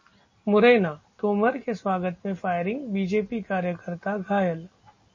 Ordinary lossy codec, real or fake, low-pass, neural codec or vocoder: MP3, 32 kbps; real; 7.2 kHz; none